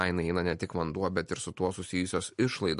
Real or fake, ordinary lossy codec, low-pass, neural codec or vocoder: real; MP3, 48 kbps; 10.8 kHz; none